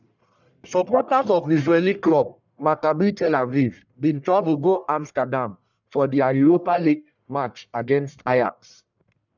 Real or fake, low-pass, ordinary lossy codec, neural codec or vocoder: fake; 7.2 kHz; none; codec, 44.1 kHz, 1.7 kbps, Pupu-Codec